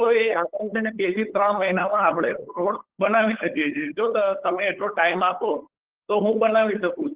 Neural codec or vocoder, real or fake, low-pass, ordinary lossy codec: codec, 16 kHz, 8 kbps, FunCodec, trained on LibriTTS, 25 frames a second; fake; 3.6 kHz; Opus, 16 kbps